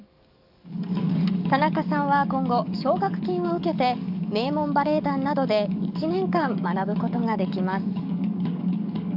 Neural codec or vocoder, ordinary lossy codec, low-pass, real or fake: codec, 44.1 kHz, 7.8 kbps, DAC; AAC, 48 kbps; 5.4 kHz; fake